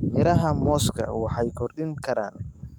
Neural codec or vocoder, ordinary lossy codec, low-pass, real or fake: codec, 44.1 kHz, 7.8 kbps, DAC; none; 19.8 kHz; fake